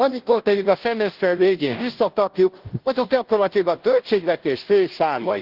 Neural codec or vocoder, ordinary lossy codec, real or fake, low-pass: codec, 16 kHz, 0.5 kbps, FunCodec, trained on Chinese and English, 25 frames a second; Opus, 16 kbps; fake; 5.4 kHz